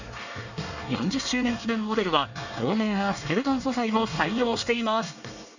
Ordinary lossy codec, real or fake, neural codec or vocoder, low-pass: none; fake; codec, 24 kHz, 1 kbps, SNAC; 7.2 kHz